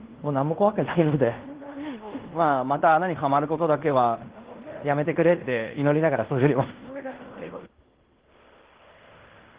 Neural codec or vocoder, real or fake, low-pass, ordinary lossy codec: codec, 16 kHz in and 24 kHz out, 0.9 kbps, LongCat-Audio-Codec, fine tuned four codebook decoder; fake; 3.6 kHz; Opus, 16 kbps